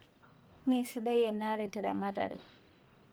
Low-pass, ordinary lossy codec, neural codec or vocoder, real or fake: none; none; codec, 44.1 kHz, 1.7 kbps, Pupu-Codec; fake